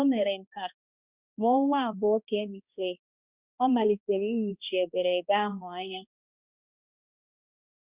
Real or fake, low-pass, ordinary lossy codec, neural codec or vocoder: fake; 3.6 kHz; Opus, 64 kbps; codec, 16 kHz, 1 kbps, X-Codec, HuBERT features, trained on balanced general audio